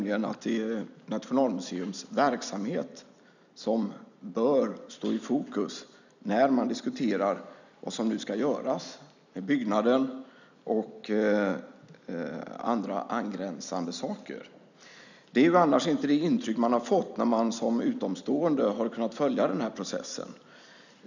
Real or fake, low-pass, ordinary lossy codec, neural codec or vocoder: real; 7.2 kHz; none; none